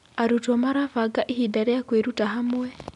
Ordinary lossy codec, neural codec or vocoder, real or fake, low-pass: none; none; real; 10.8 kHz